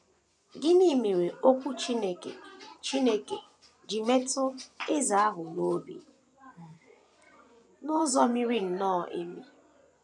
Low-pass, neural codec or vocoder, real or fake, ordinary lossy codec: none; vocoder, 24 kHz, 100 mel bands, Vocos; fake; none